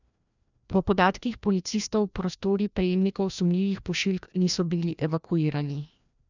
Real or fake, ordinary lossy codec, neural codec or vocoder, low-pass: fake; none; codec, 16 kHz, 1 kbps, FreqCodec, larger model; 7.2 kHz